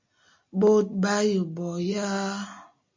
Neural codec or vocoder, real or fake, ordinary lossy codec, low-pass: none; real; MP3, 48 kbps; 7.2 kHz